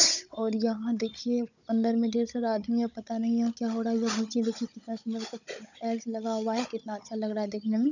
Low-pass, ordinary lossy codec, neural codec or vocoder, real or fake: 7.2 kHz; none; codec, 16 kHz, 16 kbps, FunCodec, trained on Chinese and English, 50 frames a second; fake